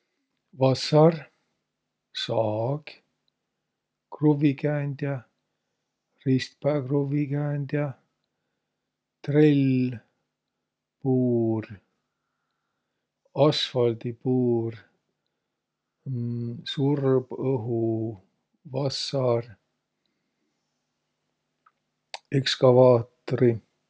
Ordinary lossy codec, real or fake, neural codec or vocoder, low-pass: none; real; none; none